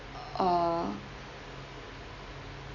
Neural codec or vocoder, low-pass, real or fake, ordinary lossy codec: none; 7.2 kHz; real; AAC, 32 kbps